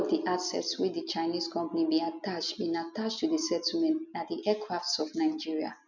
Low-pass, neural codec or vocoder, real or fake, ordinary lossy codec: 7.2 kHz; none; real; none